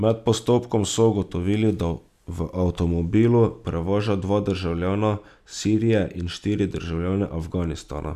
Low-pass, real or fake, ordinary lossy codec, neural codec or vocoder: 14.4 kHz; real; none; none